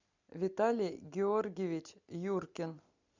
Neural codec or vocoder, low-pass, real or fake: none; 7.2 kHz; real